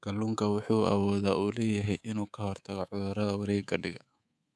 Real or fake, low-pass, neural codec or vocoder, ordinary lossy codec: fake; none; codec, 24 kHz, 3.1 kbps, DualCodec; none